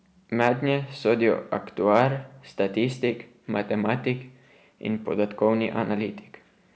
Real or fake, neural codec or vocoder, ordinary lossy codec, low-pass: real; none; none; none